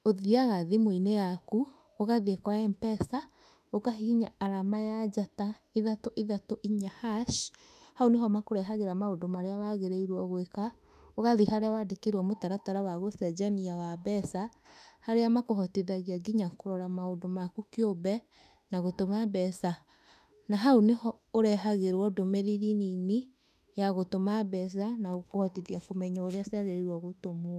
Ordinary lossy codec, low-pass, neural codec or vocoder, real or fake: none; 14.4 kHz; autoencoder, 48 kHz, 32 numbers a frame, DAC-VAE, trained on Japanese speech; fake